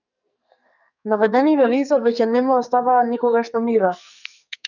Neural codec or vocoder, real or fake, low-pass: codec, 44.1 kHz, 2.6 kbps, SNAC; fake; 7.2 kHz